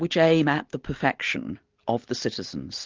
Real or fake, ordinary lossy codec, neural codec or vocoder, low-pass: real; Opus, 32 kbps; none; 7.2 kHz